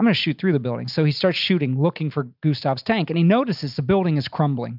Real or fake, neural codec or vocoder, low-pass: real; none; 5.4 kHz